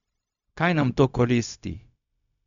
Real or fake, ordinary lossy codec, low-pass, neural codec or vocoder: fake; none; 7.2 kHz; codec, 16 kHz, 0.4 kbps, LongCat-Audio-Codec